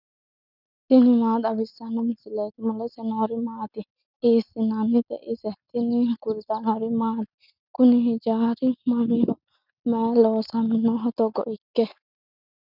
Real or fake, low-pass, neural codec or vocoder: real; 5.4 kHz; none